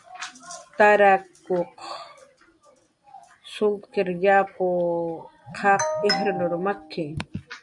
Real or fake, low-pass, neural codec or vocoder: real; 10.8 kHz; none